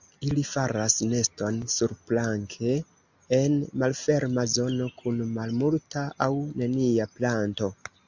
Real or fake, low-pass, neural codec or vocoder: real; 7.2 kHz; none